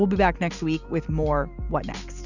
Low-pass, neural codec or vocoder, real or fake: 7.2 kHz; none; real